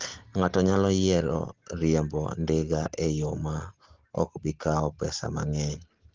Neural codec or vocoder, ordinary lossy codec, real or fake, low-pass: none; Opus, 16 kbps; real; 7.2 kHz